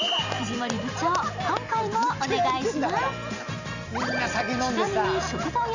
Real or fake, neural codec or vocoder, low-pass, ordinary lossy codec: real; none; 7.2 kHz; none